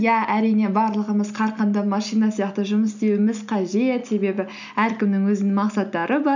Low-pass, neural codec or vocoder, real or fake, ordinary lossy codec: 7.2 kHz; none; real; none